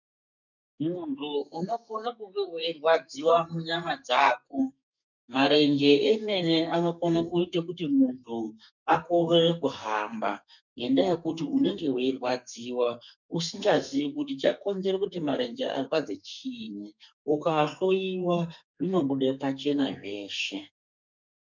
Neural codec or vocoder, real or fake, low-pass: codec, 32 kHz, 1.9 kbps, SNAC; fake; 7.2 kHz